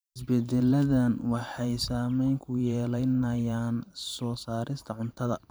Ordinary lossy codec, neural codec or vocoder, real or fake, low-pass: none; vocoder, 44.1 kHz, 128 mel bands every 512 samples, BigVGAN v2; fake; none